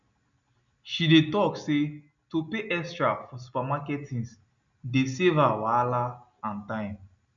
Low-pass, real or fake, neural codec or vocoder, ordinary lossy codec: 7.2 kHz; real; none; none